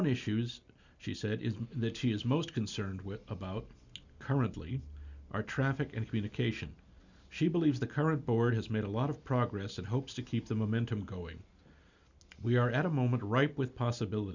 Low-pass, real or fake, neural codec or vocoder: 7.2 kHz; real; none